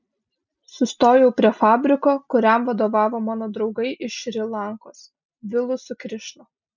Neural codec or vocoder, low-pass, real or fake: none; 7.2 kHz; real